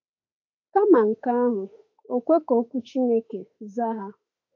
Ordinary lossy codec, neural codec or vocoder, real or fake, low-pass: AAC, 48 kbps; autoencoder, 48 kHz, 32 numbers a frame, DAC-VAE, trained on Japanese speech; fake; 7.2 kHz